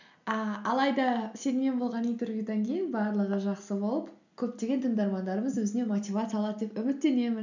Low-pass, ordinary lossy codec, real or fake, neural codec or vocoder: 7.2 kHz; none; real; none